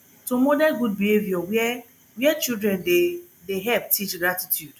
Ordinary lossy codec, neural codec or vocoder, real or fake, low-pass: none; none; real; none